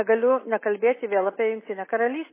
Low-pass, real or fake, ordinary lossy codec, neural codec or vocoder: 3.6 kHz; real; MP3, 16 kbps; none